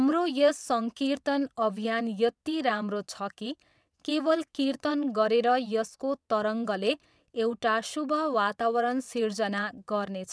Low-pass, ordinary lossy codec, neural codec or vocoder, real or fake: none; none; vocoder, 22.05 kHz, 80 mel bands, WaveNeXt; fake